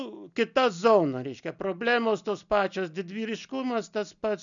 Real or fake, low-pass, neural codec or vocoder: real; 7.2 kHz; none